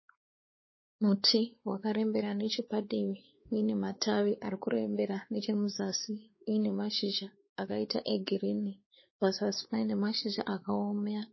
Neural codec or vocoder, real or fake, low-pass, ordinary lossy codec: codec, 16 kHz, 4 kbps, X-Codec, WavLM features, trained on Multilingual LibriSpeech; fake; 7.2 kHz; MP3, 24 kbps